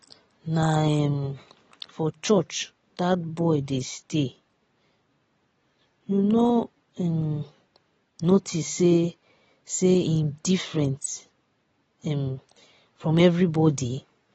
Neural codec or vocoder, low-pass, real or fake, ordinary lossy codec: none; 10.8 kHz; real; AAC, 24 kbps